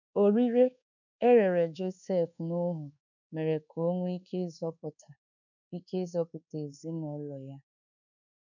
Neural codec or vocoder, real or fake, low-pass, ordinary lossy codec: codec, 24 kHz, 1.2 kbps, DualCodec; fake; 7.2 kHz; none